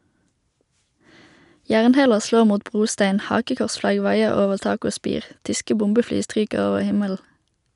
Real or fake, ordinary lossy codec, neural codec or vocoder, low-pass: real; none; none; 10.8 kHz